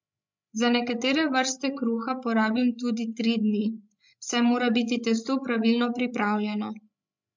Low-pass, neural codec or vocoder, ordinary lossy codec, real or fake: 7.2 kHz; codec, 16 kHz, 16 kbps, FreqCodec, larger model; MP3, 64 kbps; fake